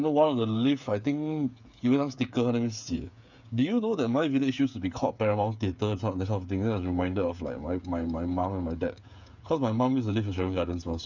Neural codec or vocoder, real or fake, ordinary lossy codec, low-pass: codec, 16 kHz, 8 kbps, FreqCodec, smaller model; fake; none; 7.2 kHz